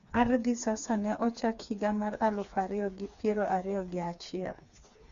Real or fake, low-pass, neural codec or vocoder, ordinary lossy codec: fake; 7.2 kHz; codec, 16 kHz, 4 kbps, FreqCodec, smaller model; Opus, 64 kbps